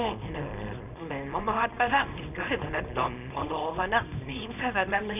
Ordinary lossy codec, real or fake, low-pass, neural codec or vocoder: none; fake; 3.6 kHz; codec, 24 kHz, 0.9 kbps, WavTokenizer, small release